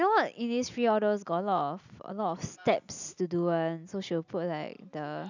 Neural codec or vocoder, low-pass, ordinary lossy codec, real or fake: none; 7.2 kHz; none; real